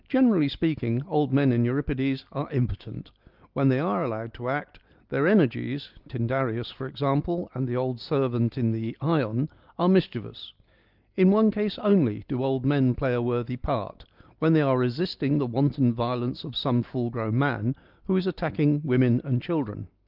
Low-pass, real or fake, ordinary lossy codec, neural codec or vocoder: 5.4 kHz; real; Opus, 32 kbps; none